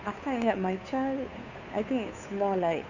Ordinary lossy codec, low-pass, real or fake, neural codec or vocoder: none; 7.2 kHz; fake; codec, 16 kHz, 2 kbps, FunCodec, trained on Chinese and English, 25 frames a second